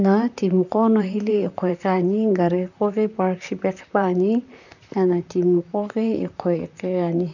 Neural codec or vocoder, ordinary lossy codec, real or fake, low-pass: vocoder, 44.1 kHz, 128 mel bands, Pupu-Vocoder; none; fake; 7.2 kHz